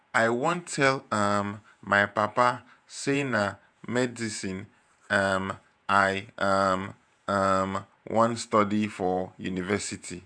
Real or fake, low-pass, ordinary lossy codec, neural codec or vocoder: fake; 9.9 kHz; none; vocoder, 48 kHz, 128 mel bands, Vocos